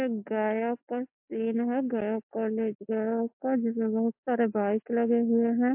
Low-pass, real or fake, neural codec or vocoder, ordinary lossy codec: 3.6 kHz; real; none; MP3, 32 kbps